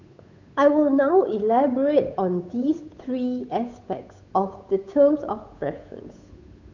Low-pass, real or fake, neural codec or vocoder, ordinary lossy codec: 7.2 kHz; fake; codec, 16 kHz, 8 kbps, FunCodec, trained on Chinese and English, 25 frames a second; none